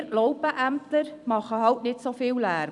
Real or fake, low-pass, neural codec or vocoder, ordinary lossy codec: fake; 10.8 kHz; vocoder, 44.1 kHz, 128 mel bands every 256 samples, BigVGAN v2; none